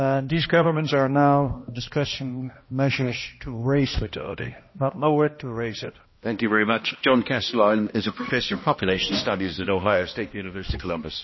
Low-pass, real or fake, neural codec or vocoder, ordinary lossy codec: 7.2 kHz; fake; codec, 16 kHz, 1 kbps, X-Codec, HuBERT features, trained on balanced general audio; MP3, 24 kbps